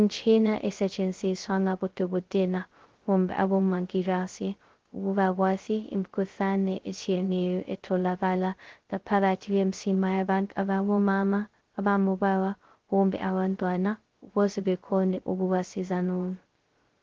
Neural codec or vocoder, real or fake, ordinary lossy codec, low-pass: codec, 16 kHz, 0.2 kbps, FocalCodec; fake; Opus, 16 kbps; 7.2 kHz